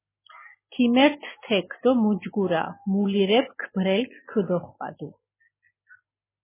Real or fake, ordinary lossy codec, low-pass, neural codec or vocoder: real; MP3, 16 kbps; 3.6 kHz; none